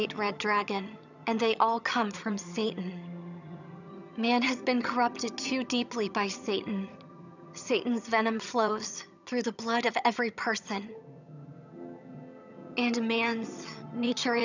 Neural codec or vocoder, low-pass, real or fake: vocoder, 22.05 kHz, 80 mel bands, HiFi-GAN; 7.2 kHz; fake